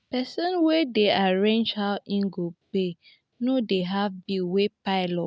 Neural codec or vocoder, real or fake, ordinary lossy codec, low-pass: none; real; none; none